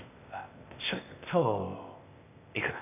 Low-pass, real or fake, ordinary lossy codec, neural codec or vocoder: 3.6 kHz; fake; none; codec, 16 kHz, 0.8 kbps, ZipCodec